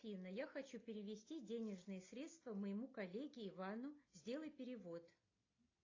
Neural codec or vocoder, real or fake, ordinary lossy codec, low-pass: none; real; AAC, 32 kbps; 7.2 kHz